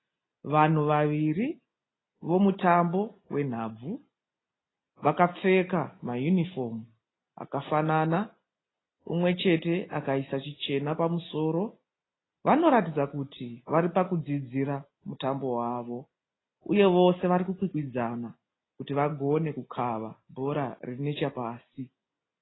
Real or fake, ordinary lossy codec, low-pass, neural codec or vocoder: real; AAC, 16 kbps; 7.2 kHz; none